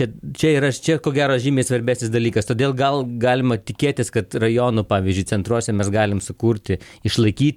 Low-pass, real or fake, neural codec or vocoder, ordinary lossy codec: 19.8 kHz; real; none; MP3, 96 kbps